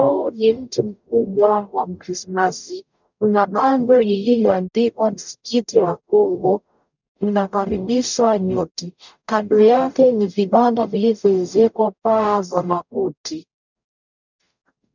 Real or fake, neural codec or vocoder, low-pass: fake; codec, 44.1 kHz, 0.9 kbps, DAC; 7.2 kHz